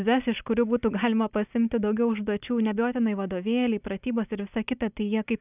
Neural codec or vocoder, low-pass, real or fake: none; 3.6 kHz; real